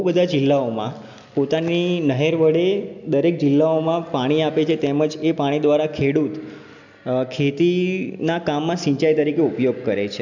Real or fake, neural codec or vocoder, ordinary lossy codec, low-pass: real; none; none; 7.2 kHz